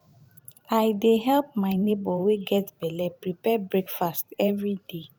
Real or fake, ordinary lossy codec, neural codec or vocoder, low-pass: fake; none; vocoder, 44.1 kHz, 128 mel bands every 512 samples, BigVGAN v2; 19.8 kHz